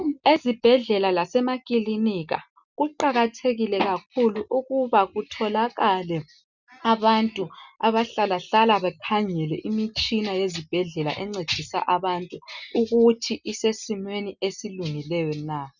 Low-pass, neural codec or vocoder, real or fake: 7.2 kHz; none; real